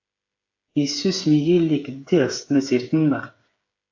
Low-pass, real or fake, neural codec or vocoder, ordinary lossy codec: 7.2 kHz; fake; codec, 16 kHz, 8 kbps, FreqCodec, smaller model; AAC, 48 kbps